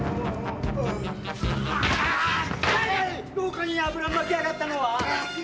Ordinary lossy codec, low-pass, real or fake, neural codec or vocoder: none; none; real; none